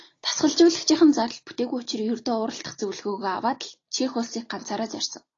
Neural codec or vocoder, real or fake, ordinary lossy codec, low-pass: none; real; AAC, 32 kbps; 7.2 kHz